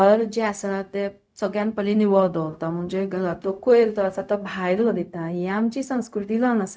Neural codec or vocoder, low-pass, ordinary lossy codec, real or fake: codec, 16 kHz, 0.4 kbps, LongCat-Audio-Codec; none; none; fake